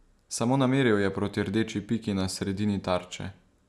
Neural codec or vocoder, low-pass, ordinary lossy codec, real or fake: none; none; none; real